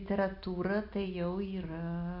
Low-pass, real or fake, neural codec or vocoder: 5.4 kHz; real; none